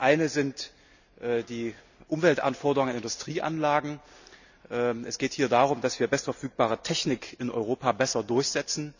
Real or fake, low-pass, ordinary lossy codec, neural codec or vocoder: real; 7.2 kHz; none; none